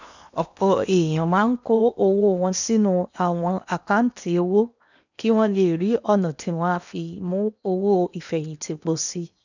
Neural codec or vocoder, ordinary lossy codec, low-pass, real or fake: codec, 16 kHz in and 24 kHz out, 0.8 kbps, FocalCodec, streaming, 65536 codes; none; 7.2 kHz; fake